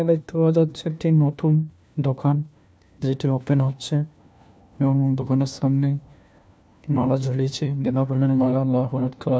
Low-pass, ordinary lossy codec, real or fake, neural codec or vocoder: none; none; fake; codec, 16 kHz, 1 kbps, FunCodec, trained on LibriTTS, 50 frames a second